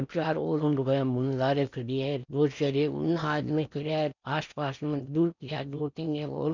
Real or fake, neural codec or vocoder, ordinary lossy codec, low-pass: fake; codec, 16 kHz in and 24 kHz out, 0.8 kbps, FocalCodec, streaming, 65536 codes; none; 7.2 kHz